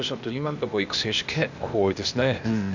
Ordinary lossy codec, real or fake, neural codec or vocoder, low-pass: none; fake; codec, 16 kHz, 0.8 kbps, ZipCodec; 7.2 kHz